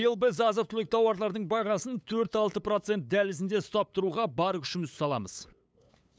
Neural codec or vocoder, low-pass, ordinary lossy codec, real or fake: codec, 16 kHz, 8 kbps, FunCodec, trained on LibriTTS, 25 frames a second; none; none; fake